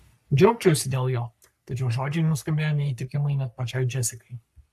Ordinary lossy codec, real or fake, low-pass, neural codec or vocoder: AAC, 96 kbps; fake; 14.4 kHz; codec, 44.1 kHz, 3.4 kbps, Pupu-Codec